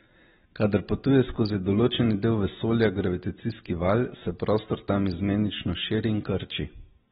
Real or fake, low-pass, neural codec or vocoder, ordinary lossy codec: real; 7.2 kHz; none; AAC, 16 kbps